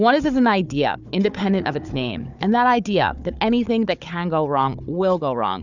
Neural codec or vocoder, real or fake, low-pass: codec, 16 kHz, 4 kbps, FunCodec, trained on Chinese and English, 50 frames a second; fake; 7.2 kHz